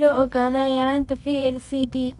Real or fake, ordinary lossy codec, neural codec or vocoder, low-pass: fake; none; codec, 24 kHz, 0.9 kbps, WavTokenizer, medium music audio release; 10.8 kHz